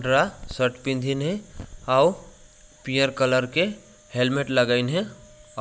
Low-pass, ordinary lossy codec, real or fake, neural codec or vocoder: none; none; real; none